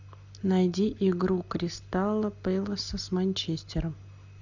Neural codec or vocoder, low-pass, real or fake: none; 7.2 kHz; real